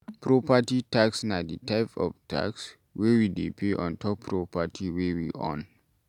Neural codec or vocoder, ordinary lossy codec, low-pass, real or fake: none; none; 19.8 kHz; real